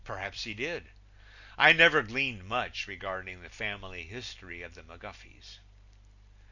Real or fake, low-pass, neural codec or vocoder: real; 7.2 kHz; none